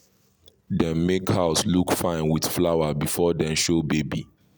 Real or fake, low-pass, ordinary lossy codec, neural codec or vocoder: real; none; none; none